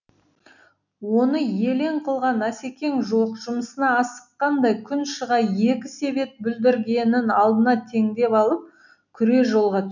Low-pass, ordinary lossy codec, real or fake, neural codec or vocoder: 7.2 kHz; none; real; none